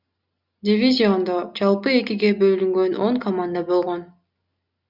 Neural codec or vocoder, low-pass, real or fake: none; 5.4 kHz; real